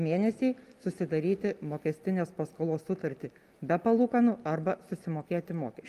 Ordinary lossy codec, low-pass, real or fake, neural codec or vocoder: Opus, 32 kbps; 14.4 kHz; real; none